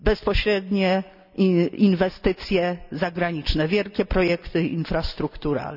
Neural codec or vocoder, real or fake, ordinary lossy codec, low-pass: none; real; none; 5.4 kHz